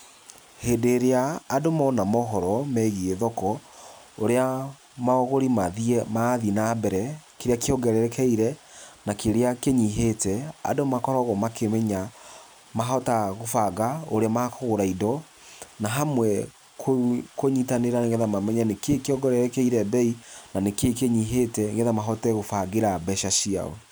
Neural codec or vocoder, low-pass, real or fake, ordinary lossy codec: none; none; real; none